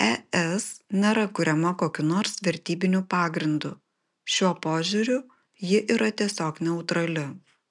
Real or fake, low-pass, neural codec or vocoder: real; 10.8 kHz; none